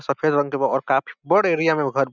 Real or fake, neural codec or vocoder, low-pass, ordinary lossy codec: real; none; 7.2 kHz; none